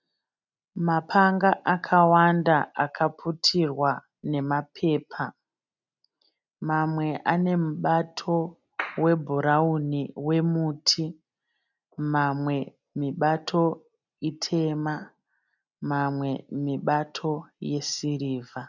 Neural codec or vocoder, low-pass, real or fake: none; 7.2 kHz; real